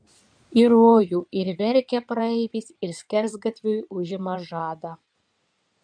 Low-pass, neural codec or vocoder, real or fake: 9.9 kHz; codec, 16 kHz in and 24 kHz out, 2.2 kbps, FireRedTTS-2 codec; fake